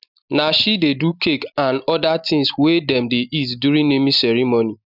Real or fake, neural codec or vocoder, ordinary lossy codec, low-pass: real; none; none; 5.4 kHz